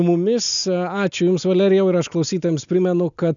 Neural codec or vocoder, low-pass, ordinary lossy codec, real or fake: none; 7.2 kHz; MP3, 96 kbps; real